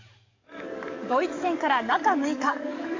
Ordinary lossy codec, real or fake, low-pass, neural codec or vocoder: MP3, 64 kbps; fake; 7.2 kHz; codec, 44.1 kHz, 7.8 kbps, DAC